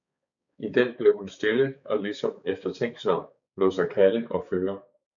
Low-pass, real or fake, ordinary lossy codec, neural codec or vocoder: 7.2 kHz; fake; MP3, 96 kbps; codec, 16 kHz, 4 kbps, X-Codec, HuBERT features, trained on balanced general audio